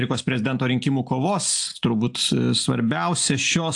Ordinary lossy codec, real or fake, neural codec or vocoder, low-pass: AAC, 64 kbps; real; none; 10.8 kHz